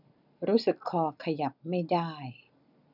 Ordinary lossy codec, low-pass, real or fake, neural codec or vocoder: none; 5.4 kHz; real; none